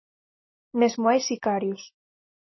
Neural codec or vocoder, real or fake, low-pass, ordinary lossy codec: none; real; 7.2 kHz; MP3, 24 kbps